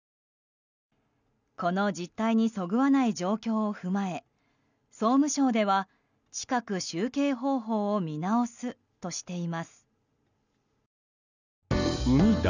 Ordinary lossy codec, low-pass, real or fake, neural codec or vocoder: none; 7.2 kHz; real; none